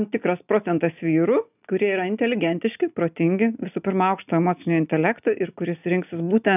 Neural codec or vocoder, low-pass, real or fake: none; 3.6 kHz; real